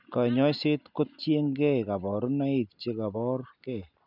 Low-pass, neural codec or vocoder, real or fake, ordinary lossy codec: 5.4 kHz; none; real; none